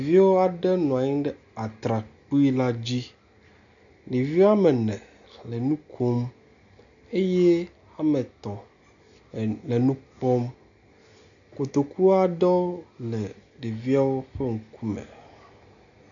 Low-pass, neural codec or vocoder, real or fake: 7.2 kHz; none; real